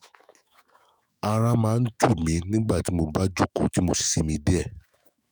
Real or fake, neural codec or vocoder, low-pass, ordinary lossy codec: fake; autoencoder, 48 kHz, 128 numbers a frame, DAC-VAE, trained on Japanese speech; none; none